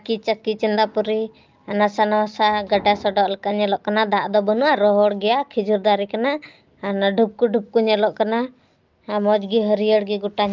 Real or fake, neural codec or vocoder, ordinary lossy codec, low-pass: real; none; Opus, 32 kbps; 7.2 kHz